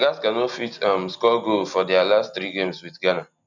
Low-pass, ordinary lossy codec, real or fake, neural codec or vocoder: 7.2 kHz; none; real; none